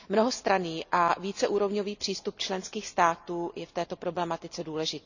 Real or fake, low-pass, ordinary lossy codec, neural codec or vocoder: real; 7.2 kHz; none; none